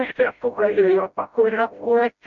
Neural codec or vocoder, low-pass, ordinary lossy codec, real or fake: codec, 16 kHz, 0.5 kbps, FreqCodec, smaller model; 7.2 kHz; AAC, 64 kbps; fake